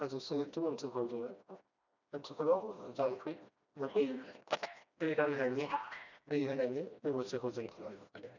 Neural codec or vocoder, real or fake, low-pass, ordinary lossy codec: codec, 16 kHz, 1 kbps, FreqCodec, smaller model; fake; 7.2 kHz; none